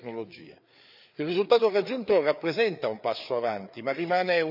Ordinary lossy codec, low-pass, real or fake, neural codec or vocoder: none; 5.4 kHz; fake; codec, 16 kHz, 4 kbps, FreqCodec, larger model